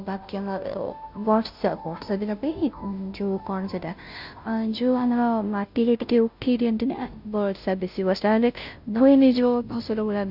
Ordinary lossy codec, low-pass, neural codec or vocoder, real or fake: none; 5.4 kHz; codec, 16 kHz, 0.5 kbps, FunCodec, trained on Chinese and English, 25 frames a second; fake